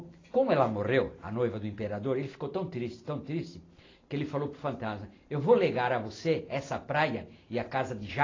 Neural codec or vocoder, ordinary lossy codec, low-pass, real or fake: none; AAC, 32 kbps; 7.2 kHz; real